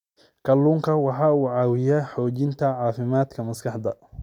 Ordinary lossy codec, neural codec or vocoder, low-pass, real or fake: MP3, 96 kbps; none; 19.8 kHz; real